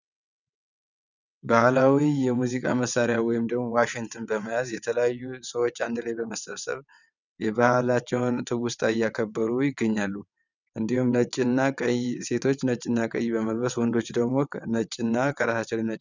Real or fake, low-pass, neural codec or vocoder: fake; 7.2 kHz; vocoder, 22.05 kHz, 80 mel bands, WaveNeXt